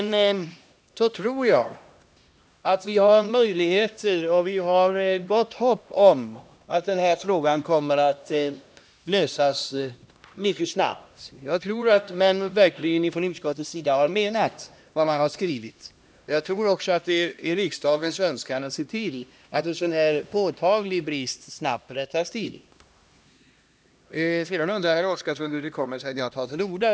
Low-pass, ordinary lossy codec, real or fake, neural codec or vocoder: none; none; fake; codec, 16 kHz, 1 kbps, X-Codec, HuBERT features, trained on LibriSpeech